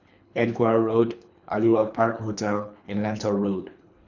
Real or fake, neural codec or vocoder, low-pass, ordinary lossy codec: fake; codec, 24 kHz, 3 kbps, HILCodec; 7.2 kHz; none